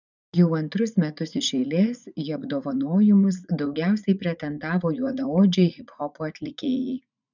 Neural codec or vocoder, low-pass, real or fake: vocoder, 44.1 kHz, 80 mel bands, Vocos; 7.2 kHz; fake